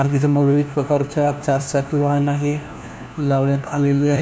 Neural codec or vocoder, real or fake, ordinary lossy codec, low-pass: codec, 16 kHz, 1 kbps, FunCodec, trained on LibriTTS, 50 frames a second; fake; none; none